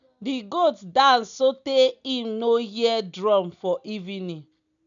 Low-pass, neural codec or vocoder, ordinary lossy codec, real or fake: 7.2 kHz; none; none; real